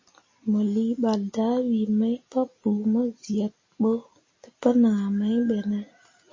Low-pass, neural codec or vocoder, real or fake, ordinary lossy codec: 7.2 kHz; none; real; MP3, 32 kbps